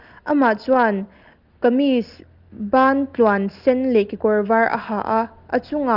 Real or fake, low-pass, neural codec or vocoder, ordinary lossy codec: real; 5.4 kHz; none; Opus, 32 kbps